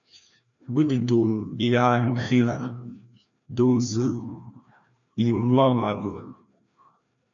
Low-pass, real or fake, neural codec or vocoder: 7.2 kHz; fake; codec, 16 kHz, 1 kbps, FreqCodec, larger model